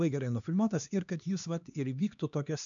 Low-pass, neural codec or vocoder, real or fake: 7.2 kHz; codec, 16 kHz, 2 kbps, X-Codec, HuBERT features, trained on LibriSpeech; fake